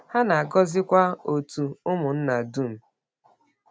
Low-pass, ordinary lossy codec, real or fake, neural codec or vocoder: none; none; real; none